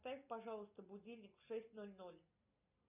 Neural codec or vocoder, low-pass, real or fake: none; 3.6 kHz; real